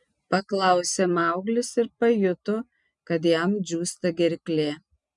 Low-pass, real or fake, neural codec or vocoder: 10.8 kHz; real; none